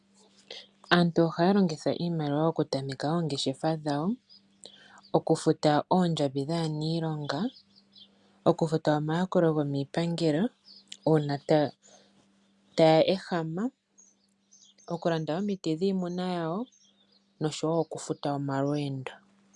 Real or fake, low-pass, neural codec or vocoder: real; 10.8 kHz; none